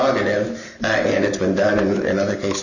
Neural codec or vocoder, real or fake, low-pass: none; real; 7.2 kHz